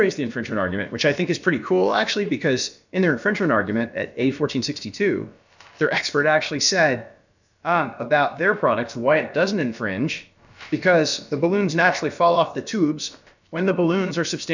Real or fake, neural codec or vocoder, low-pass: fake; codec, 16 kHz, about 1 kbps, DyCAST, with the encoder's durations; 7.2 kHz